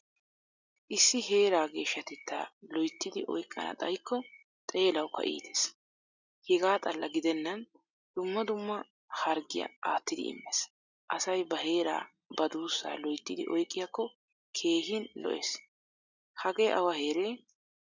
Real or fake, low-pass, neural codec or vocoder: real; 7.2 kHz; none